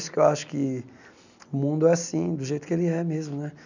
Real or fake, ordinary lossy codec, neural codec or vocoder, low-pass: real; none; none; 7.2 kHz